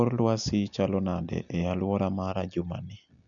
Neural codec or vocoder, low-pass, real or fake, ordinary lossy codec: none; 7.2 kHz; real; none